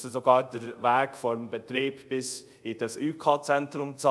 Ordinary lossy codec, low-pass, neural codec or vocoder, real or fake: none; none; codec, 24 kHz, 0.5 kbps, DualCodec; fake